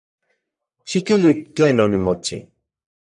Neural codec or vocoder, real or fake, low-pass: codec, 44.1 kHz, 1.7 kbps, Pupu-Codec; fake; 10.8 kHz